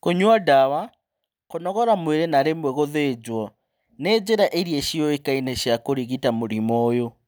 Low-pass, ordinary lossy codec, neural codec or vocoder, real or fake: none; none; none; real